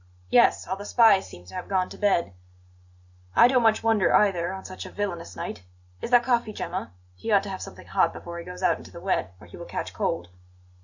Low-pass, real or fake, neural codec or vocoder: 7.2 kHz; real; none